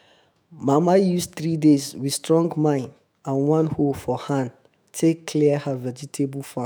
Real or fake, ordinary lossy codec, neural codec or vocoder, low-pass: fake; none; autoencoder, 48 kHz, 128 numbers a frame, DAC-VAE, trained on Japanese speech; none